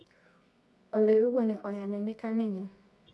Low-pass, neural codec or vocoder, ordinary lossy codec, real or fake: none; codec, 24 kHz, 0.9 kbps, WavTokenizer, medium music audio release; none; fake